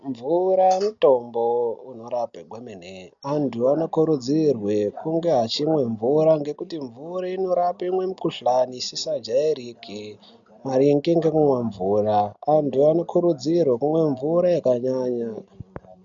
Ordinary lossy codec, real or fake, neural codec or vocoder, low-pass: MP3, 64 kbps; real; none; 7.2 kHz